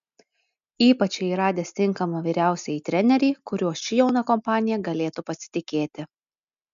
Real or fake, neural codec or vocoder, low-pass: real; none; 7.2 kHz